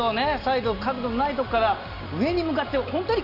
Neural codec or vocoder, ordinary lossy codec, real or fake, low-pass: none; none; real; 5.4 kHz